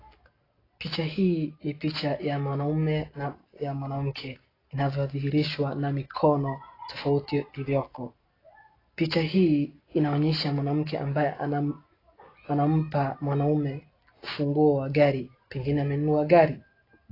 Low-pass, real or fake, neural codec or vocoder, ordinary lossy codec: 5.4 kHz; real; none; AAC, 24 kbps